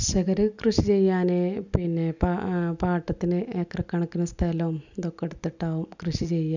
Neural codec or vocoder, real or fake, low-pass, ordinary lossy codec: none; real; 7.2 kHz; none